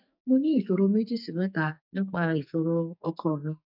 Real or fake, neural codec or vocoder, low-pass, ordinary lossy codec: fake; codec, 44.1 kHz, 2.6 kbps, SNAC; 5.4 kHz; none